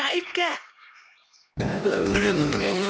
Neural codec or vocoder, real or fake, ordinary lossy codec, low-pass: codec, 16 kHz, 1 kbps, X-Codec, HuBERT features, trained on LibriSpeech; fake; none; none